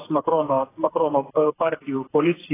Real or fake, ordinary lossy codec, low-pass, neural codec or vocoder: fake; AAC, 16 kbps; 3.6 kHz; codec, 16 kHz, 4 kbps, FreqCodec, smaller model